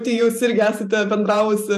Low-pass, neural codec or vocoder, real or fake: 14.4 kHz; vocoder, 44.1 kHz, 128 mel bands every 512 samples, BigVGAN v2; fake